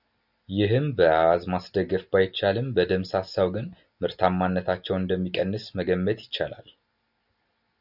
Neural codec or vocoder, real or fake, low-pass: none; real; 5.4 kHz